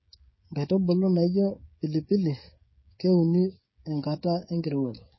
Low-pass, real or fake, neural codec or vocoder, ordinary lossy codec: 7.2 kHz; fake; codec, 16 kHz, 16 kbps, FreqCodec, smaller model; MP3, 24 kbps